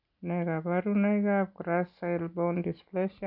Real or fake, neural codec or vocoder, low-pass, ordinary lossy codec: real; none; 5.4 kHz; none